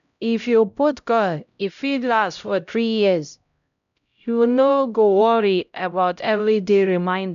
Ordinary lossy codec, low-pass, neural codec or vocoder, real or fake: none; 7.2 kHz; codec, 16 kHz, 0.5 kbps, X-Codec, HuBERT features, trained on LibriSpeech; fake